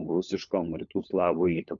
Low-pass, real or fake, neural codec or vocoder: 7.2 kHz; fake; codec, 16 kHz, 4 kbps, FunCodec, trained on LibriTTS, 50 frames a second